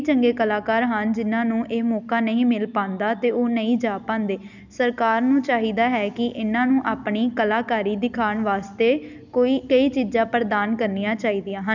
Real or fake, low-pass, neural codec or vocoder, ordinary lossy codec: real; 7.2 kHz; none; none